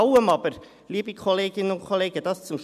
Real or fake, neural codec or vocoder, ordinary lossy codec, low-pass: real; none; none; 14.4 kHz